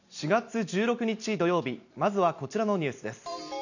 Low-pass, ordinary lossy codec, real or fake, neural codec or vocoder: 7.2 kHz; none; fake; vocoder, 44.1 kHz, 128 mel bands every 256 samples, BigVGAN v2